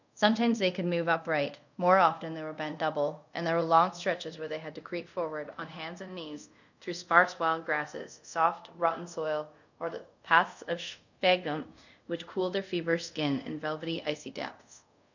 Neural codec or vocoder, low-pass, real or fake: codec, 24 kHz, 0.5 kbps, DualCodec; 7.2 kHz; fake